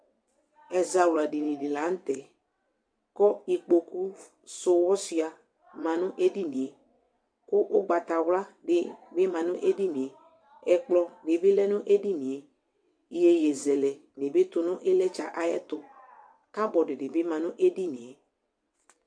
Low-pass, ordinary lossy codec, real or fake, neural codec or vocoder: 9.9 kHz; MP3, 64 kbps; fake; vocoder, 22.05 kHz, 80 mel bands, WaveNeXt